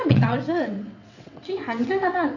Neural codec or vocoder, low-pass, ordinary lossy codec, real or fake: vocoder, 22.05 kHz, 80 mel bands, WaveNeXt; 7.2 kHz; none; fake